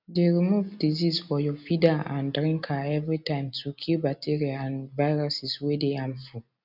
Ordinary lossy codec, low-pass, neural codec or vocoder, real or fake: none; 5.4 kHz; none; real